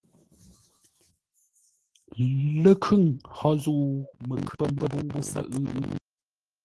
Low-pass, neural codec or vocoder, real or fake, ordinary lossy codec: 10.8 kHz; autoencoder, 48 kHz, 128 numbers a frame, DAC-VAE, trained on Japanese speech; fake; Opus, 16 kbps